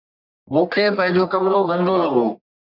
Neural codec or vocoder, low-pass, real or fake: codec, 44.1 kHz, 1.7 kbps, Pupu-Codec; 5.4 kHz; fake